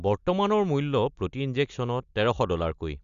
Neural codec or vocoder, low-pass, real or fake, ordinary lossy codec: none; 7.2 kHz; real; Opus, 64 kbps